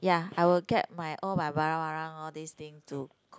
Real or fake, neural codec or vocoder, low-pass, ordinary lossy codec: real; none; none; none